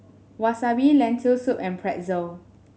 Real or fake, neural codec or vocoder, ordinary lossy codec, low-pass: real; none; none; none